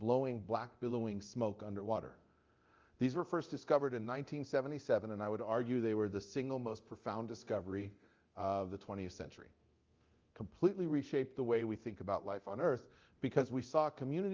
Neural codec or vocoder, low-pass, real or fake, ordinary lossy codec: codec, 24 kHz, 0.9 kbps, DualCodec; 7.2 kHz; fake; Opus, 32 kbps